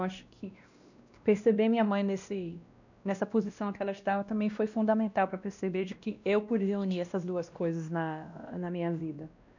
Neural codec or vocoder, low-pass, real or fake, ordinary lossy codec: codec, 16 kHz, 1 kbps, X-Codec, WavLM features, trained on Multilingual LibriSpeech; 7.2 kHz; fake; none